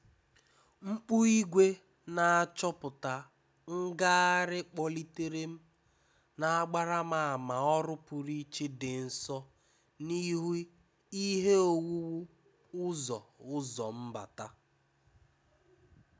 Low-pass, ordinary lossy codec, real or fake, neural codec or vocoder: none; none; real; none